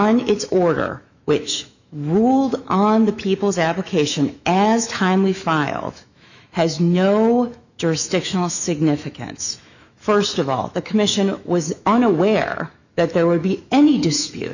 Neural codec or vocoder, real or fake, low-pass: autoencoder, 48 kHz, 128 numbers a frame, DAC-VAE, trained on Japanese speech; fake; 7.2 kHz